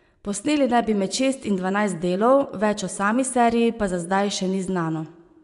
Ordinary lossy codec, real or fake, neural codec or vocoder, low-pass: none; fake; vocoder, 24 kHz, 100 mel bands, Vocos; 10.8 kHz